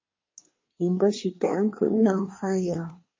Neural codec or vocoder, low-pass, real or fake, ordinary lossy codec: codec, 24 kHz, 1 kbps, SNAC; 7.2 kHz; fake; MP3, 32 kbps